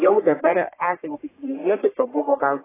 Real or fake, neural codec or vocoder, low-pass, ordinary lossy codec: fake; codec, 44.1 kHz, 1.7 kbps, Pupu-Codec; 3.6 kHz; AAC, 16 kbps